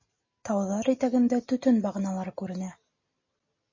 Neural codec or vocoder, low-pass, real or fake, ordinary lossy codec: none; 7.2 kHz; real; MP3, 32 kbps